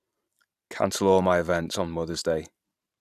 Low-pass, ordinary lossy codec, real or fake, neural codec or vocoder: 14.4 kHz; none; fake; vocoder, 44.1 kHz, 128 mel bands every 512 samples, BigVGAN v2